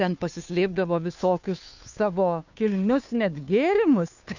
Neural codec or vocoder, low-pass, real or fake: codec, 16 kHz, 2 kbps, FunCodec, trained on Chinese and English, 25 frames a second; 7.2 kHz; fake